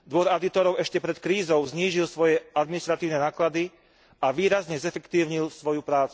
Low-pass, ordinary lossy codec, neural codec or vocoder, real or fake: none; none; none; real